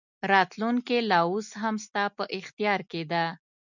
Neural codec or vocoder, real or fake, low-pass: none; real; 7.2 kHz